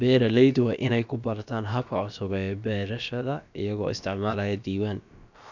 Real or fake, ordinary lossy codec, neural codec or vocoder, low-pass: fake; none; codec, 16 kHz, about 1 kbps, DyCAST, with the encoder's durations; 7.2 kHz